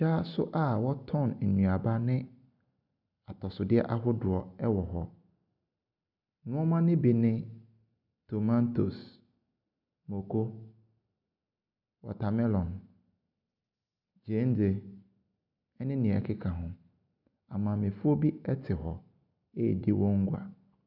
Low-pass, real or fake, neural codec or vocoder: 5.4 kHz; real; none